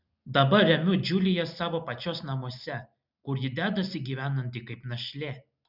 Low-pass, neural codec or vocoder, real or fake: 5.4 kHz; none; real